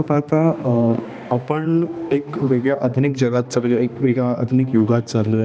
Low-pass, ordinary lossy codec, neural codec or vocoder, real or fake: none; none; codec, 16 kHz, 2 kbps, X-Codec, HuBERT features, trained on balanced general audio; fake